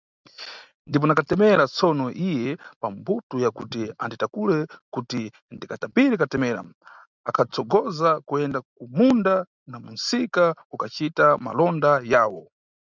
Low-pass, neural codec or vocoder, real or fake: 7.2 kHz; none; real